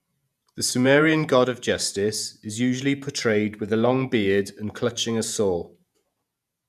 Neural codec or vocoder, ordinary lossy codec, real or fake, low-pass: vocoder, 48 kHz, 128 mel bands, Vocos; none; fake; 14.4 kHz